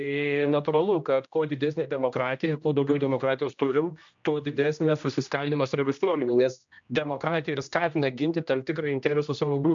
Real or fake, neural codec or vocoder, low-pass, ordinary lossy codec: fake; codec, 16 kHz, 1 kbps, X-Codec, HuBERT features, trained on general audio; 7.2 kHz; MP3, 64 kbps